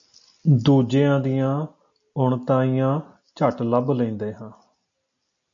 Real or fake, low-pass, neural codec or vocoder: real; 7.2 kHz; none